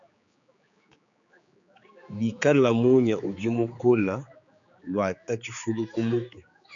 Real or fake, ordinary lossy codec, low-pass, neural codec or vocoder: fake; MP3, 96 kbps; 7.2 kHz; codec, 16 kHz, 4 kbps, X-Codec, HuBERT features, trained on general audio